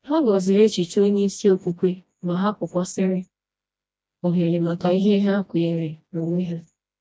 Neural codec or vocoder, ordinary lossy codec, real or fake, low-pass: codec, 16 kHz, 1 kbps, FreqCodec, smaller model; none; fake; none